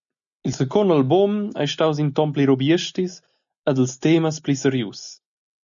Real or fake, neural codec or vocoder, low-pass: real; none; 7.2 kHz